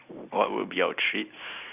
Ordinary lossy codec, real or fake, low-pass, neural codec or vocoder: none; real; 3.6 kHz; none